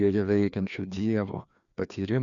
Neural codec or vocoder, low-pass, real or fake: codec, 16 kHz, 2 kbps, FreqCodec, larger model; 7.2 kHz; fake